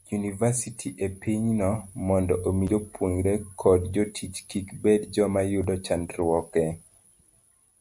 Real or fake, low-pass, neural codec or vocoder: real; 10.8 kHz; none